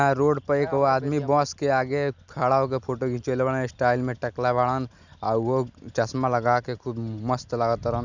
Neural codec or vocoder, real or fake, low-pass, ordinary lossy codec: none; real; 7.2 kHz; none